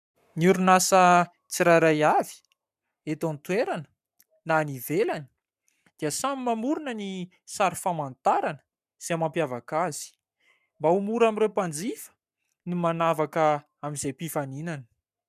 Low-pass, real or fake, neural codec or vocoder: 14.4 kHz; fake; codec, 44.1 kHz, 7.8 kbps, Pupu-Codec